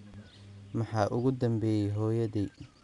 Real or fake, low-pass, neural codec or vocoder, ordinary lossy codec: real; 10.8 kHz; none; none